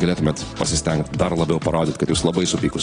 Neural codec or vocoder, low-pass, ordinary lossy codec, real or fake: none; 9.9 kHz; AAC, 32 kbps; real